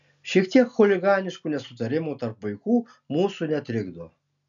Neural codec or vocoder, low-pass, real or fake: none; 7.2 kHz; real